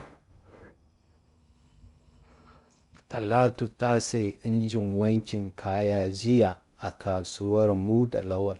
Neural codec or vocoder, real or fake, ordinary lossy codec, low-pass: codec, 16 kHz in and 24 kHz out, 0.6 kbps, FocalCodec, streaming, 2048 codes; fake; Opus, 64 kbps; 10.8 kHz